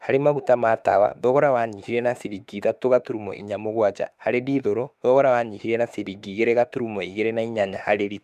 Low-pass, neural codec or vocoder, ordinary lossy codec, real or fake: 14.4 kHz; autoencoder, 48 kHz, 32 numbers a frame, DAC-VAE, trained on Japanese speech; none; fake